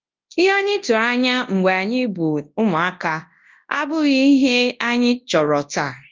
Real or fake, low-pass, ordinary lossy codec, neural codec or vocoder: fake; 7.2 kHz; Opus, 32 kbps; codec, 24 kHz, 0.9 kbps, WavTokenizer, large speech release